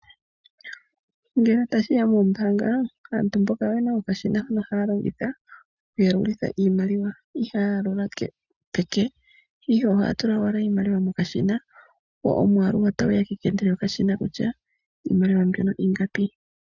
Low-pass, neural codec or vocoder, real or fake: 7.2 kHz; none; real